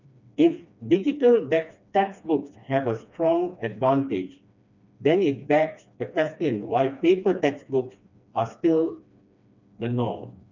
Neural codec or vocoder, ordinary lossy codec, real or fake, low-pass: codec, 16 kHz, 2 kbps, FreqCodec, smaller model; none; fake; 7.2 kHz